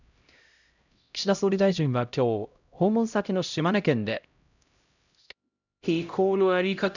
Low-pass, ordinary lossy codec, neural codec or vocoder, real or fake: 7.2 kHz; none; codec, 16 kHz, 0.5 kbps, X-Codec, HuBERT features, trained on LibriSpeech; fake